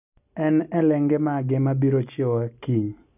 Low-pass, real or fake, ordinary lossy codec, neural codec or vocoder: 3.6 kHz; real; none; none